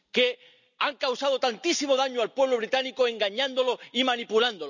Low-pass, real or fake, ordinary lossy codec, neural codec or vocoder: 7.2 kHz; real; none; none